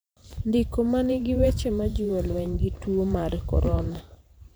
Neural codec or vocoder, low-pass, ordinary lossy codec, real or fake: vocoder, 44.1 kHz, 128 mel bands every 512 samples, BigVGAN v2; none; none; fake